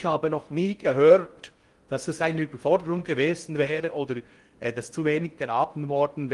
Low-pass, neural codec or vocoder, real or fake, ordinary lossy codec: 10.8 kHz; codec, 16 kHz in and 24 kHz out, 0.6 kbps, FocalCodec, streaming, 4096 codes; fake; Opus, 24 kbps